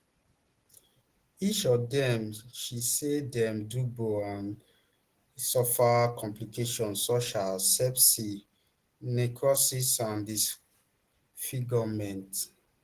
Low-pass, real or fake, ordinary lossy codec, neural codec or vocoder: 14.4 kHz; real; Opus, 16 kbps; none